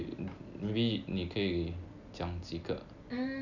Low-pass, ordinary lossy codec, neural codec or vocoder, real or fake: 7.2 kHz; none; none; real